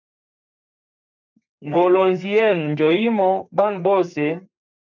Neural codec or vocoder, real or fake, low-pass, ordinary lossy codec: codec, 32 kHz, 1.9 kbps, SNAC; fake; 7.2 kHz; MP3, 64 kbps